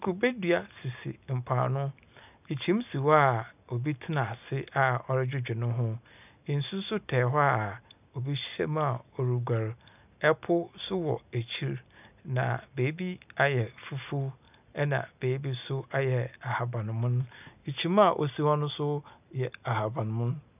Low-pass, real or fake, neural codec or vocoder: 3.6 kHz; real; none